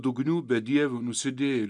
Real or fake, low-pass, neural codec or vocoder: real; 10.8 kHz; none